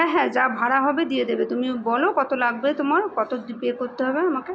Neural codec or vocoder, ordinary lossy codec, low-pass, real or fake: none; none; none; real